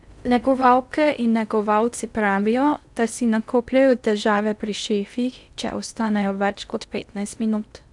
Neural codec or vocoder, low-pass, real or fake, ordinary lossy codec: codec, 16 kHz in and 24 kHz out, 0.6 kbps, FocalCodec, streaming, 4096 codes; 10.8 kHz; fake; none